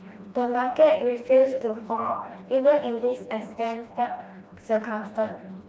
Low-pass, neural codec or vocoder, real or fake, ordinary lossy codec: none; codec, 16 kHz, 1 kbps, FreqCodec, smaller model; fake; none